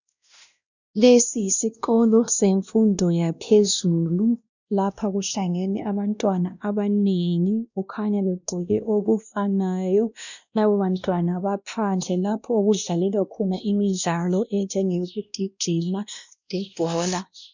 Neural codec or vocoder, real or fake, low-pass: codec, 16 kHz, 1 kbps, X-Codec, WavLM features, trained on Multilingual LibriSpeech; fake; 7.2 kHz